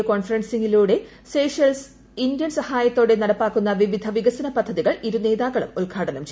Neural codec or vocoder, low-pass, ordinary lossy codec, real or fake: none; none; none; real